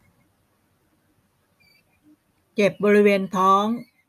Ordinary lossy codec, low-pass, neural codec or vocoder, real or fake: none; 14.4 kHz; none; real